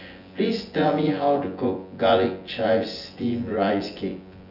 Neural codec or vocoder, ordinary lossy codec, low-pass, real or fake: vocoder, 24 kHz, 100 mel bands, Vocos; Opus, 64 kbps; 5.4 kHz; fake